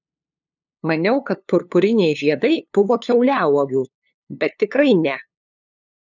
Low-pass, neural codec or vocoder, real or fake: 7.2 kHz; codec, 16 kHz, 2 kbps, FunCodec, trained on LibriTTS, 25 frames a second; fake